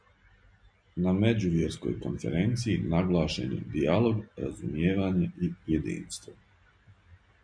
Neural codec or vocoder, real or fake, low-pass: none; real; 9.9 kHz